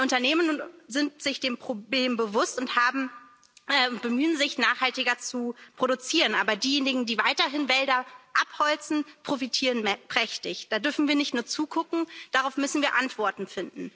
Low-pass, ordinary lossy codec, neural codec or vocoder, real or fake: none; none; none; real